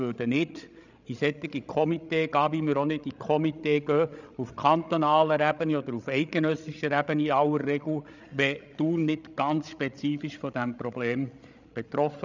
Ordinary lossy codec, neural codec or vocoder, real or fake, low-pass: none; codec, 16 kHz, 16 kbps, FreqCodec, larger model; fake; 7.2 kHz